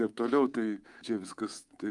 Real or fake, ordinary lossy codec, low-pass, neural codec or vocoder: fake; Opus, 32 kbps; 10.8 kHz; codec, 24 kHz, 3.1 kbps, DualCodec